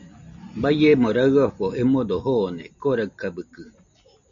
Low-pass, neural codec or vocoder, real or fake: 7.2 kHz; none; real